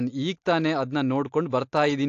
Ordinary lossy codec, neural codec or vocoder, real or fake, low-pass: AAC, 48 kbps; none; real; 7.2 kHz